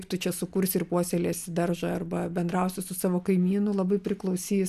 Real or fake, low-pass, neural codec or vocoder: real; 14.4 kHz; none